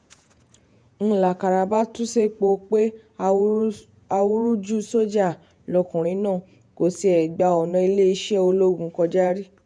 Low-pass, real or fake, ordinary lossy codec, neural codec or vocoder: 9.9 kHz; fake; none; vocoder, 48 kHz, 128 mel bands, Vocos